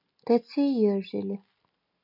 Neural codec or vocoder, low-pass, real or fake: none; 5.4 kHz; real